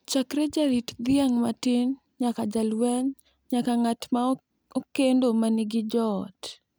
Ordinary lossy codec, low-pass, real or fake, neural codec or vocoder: none; none; real; none